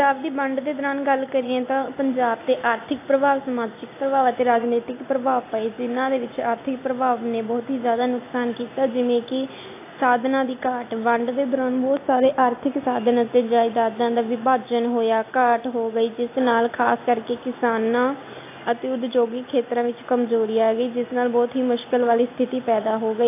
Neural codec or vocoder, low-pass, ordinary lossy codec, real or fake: none; 3.6 kHz; AAC, 24 kbps; real